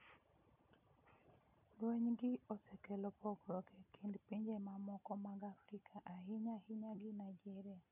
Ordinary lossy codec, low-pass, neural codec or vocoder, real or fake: none; 3.6 kHz; none; real